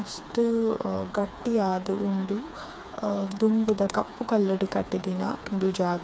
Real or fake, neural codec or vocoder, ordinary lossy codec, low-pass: fake; codec, 16 kHz, 4 kbps, FreqCodec, smaller model; none; none